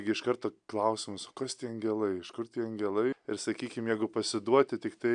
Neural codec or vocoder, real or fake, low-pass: none; real; 9.9 kHz